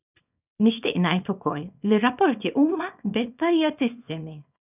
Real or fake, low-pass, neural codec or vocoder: fake; 3.6 kHz; codec, 24 kHz, 0.9 kbps, WavTokenizer, small release